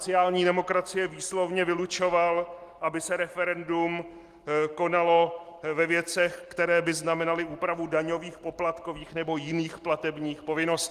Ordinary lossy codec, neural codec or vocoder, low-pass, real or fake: Opus, 24 kbps; none; 14.4 kHz; real